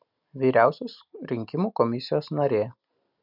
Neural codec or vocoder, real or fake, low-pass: none; real; 5.4 kHz